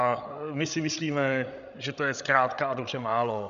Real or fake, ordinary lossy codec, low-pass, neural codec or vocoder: fake; MP3, 96 kbps; 7.2 kHz; codec, 16 kHz, 16 kbps, FreqCodec, larger model